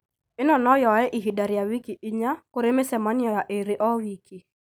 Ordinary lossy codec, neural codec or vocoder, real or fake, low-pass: none; none; real; none